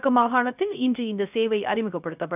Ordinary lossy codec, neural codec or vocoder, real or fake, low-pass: none; codec, 16 kHz, 0.3 kbps, FocalCodec; fake; 3.6 kHz